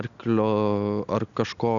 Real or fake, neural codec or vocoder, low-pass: real; none; 7.2 kHz